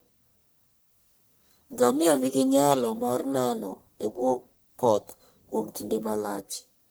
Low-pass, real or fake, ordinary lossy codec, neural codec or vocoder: none; fake; none; codec, 44.1 kHz, 1.7 kbps, Pupu-Codec